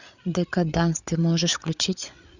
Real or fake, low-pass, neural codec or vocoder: fake; 7.2 kHz; codec, 16 kHz, 8 kbps, FreqCodec, larger model